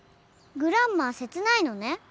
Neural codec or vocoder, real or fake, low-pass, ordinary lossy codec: none; real; none; none